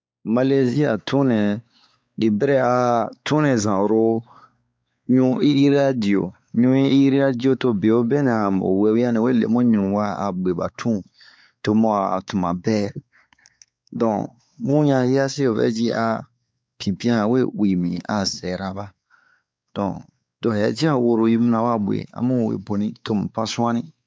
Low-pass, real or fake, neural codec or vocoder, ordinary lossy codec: none; fake; codec, 16 kHz, 4 kbps, X-Codec, WavLM features, trained on Multilingual LibriSpeech; none